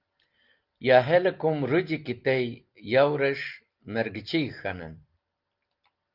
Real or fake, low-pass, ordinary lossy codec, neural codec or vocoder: real; 5.4 kHz; Opus, 24 kbps; none